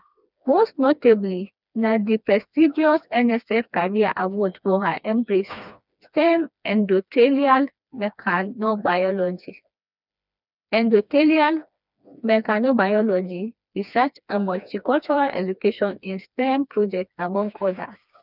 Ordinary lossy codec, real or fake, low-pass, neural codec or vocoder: none; fake; 5.4 kHz; codec, 16 kHz, 2 kbps, FreqCodec, smaller model